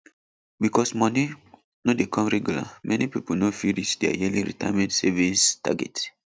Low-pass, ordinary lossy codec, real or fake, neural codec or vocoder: none; none; real; none